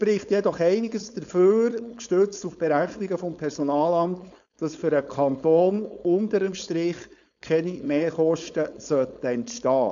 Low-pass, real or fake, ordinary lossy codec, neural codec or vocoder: 7.2 kHz; fake; none; codec, 16 kHz, 4.8 kbps, FACodec